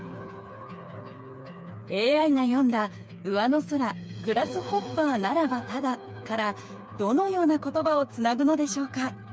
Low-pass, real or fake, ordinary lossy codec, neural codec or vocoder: none; fake; none; codec, 16 kHz, 4 kbps, FreqCodec, smaller model